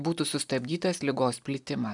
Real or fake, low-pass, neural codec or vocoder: real; 10.8 kHz; none